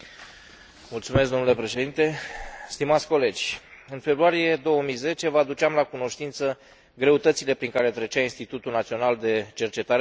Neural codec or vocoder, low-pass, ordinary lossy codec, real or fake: none; none; none; real